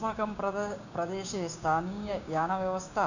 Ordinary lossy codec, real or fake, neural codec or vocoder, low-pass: none; fake; codec, 16 kHz, 6 kbps, DAC; 7.2 kHz